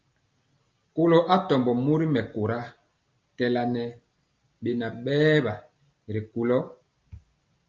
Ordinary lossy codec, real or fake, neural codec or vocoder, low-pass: Opus, 24 kbps; real; none; 7.2 kHz